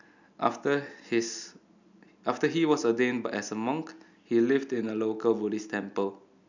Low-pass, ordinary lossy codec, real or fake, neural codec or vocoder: 7.2 kHz; none; real; none